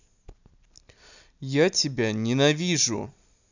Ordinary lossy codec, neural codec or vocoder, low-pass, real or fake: none; none; 7.2 kHz; real